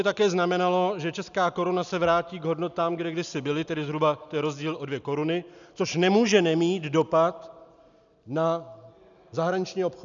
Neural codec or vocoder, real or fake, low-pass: none; real; 7.2 kHz